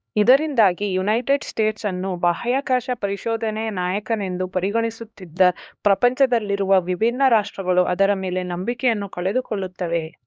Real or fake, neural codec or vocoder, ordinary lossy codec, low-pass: fake; codec, 16 kHz, 2 kbps, X-Codec, HuBERT features, trained on LibriSpeech; none; none